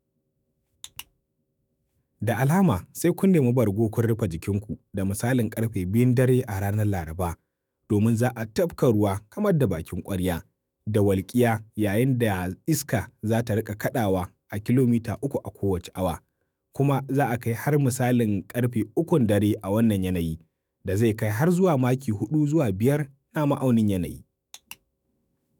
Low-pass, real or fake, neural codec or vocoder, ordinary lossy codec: none; fake; autoencoder, 48 kHz, 128 numbers a frame, DAC-VAE, trained on Japanese speech; none